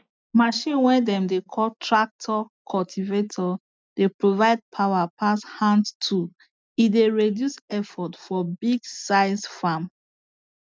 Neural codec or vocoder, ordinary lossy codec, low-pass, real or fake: none; none; none; real